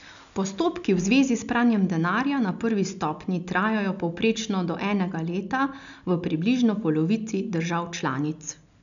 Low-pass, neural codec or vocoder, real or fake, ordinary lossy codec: 7.2 kHz; none; real; none